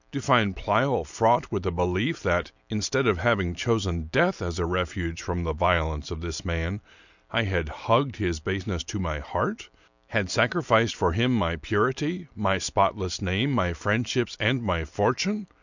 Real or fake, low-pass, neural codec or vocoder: real; 7.2 kHz; none